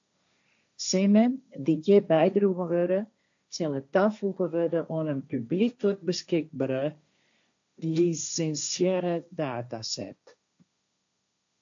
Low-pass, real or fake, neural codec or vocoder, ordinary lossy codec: 7.2 kHz; fake; codec, 16 kHz, 1.1 kbps, Voila-Tokenizer; AAC, 64 kbps